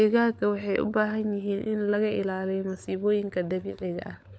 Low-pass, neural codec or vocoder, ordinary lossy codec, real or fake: none; codec, 16 kHz, 16 kbps, FunCodec, trained on LibriTTS, 50 frames a second; none; fake